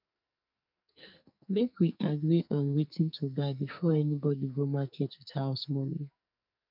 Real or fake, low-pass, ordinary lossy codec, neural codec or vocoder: fake; 5.4 kHz; none; codec, 44.1 kHz, 2.6 kbps, SNAC